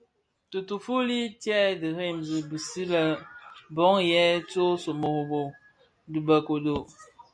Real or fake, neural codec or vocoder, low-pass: real; none; 9.9 kHz